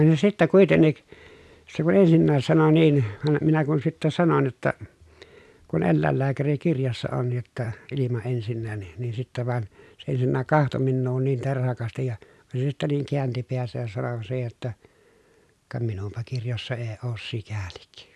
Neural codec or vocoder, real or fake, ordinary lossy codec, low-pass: none; real; none; none